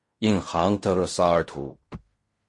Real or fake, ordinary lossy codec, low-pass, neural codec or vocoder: fake; MP3, 48 kbps; 10.8 kHz; codec, 16 kHz in and 24 kHz out, 0.4 kbps, LongCat-Audio-Codec, fine tuned four codebook decoder